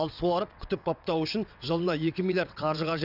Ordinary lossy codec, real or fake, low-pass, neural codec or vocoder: none; fake; 5.4 kHz; vocoder, 22.05 kHz, 80 mel bands, WaveNeXt